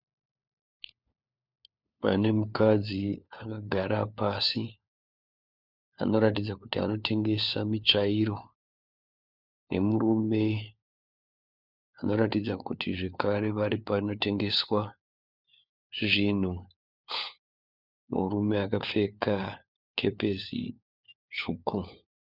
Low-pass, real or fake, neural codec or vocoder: 5.4 kHz; fake; codec, 16 kHz, 4 kbps, FunCodec, trained on LibriTTS, 50 frames a second